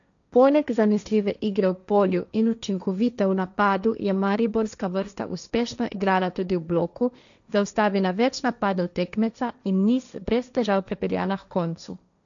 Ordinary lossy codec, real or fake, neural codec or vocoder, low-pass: none; fake; codec, 16 kHz, 1.1 kbps, Voila-Tokenizer; 7.2 kHz